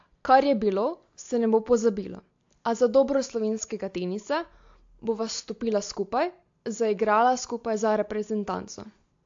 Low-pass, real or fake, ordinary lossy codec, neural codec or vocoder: 7.2 kHz; real; AAC, 48 kbps; none